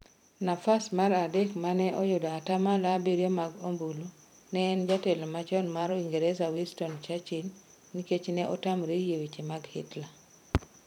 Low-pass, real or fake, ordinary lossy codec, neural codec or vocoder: 19.8 kHz; fake; none; vocoder, 44.1 kHz, 128 mel bands every 512 samples, BigVGAN v2